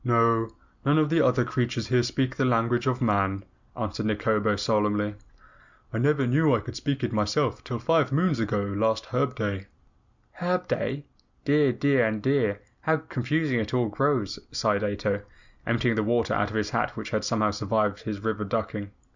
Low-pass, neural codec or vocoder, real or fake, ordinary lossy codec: 7.2 kHz; none; real; Opus, 64 kbps